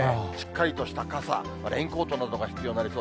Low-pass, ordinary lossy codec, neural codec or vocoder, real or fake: none; none; none; real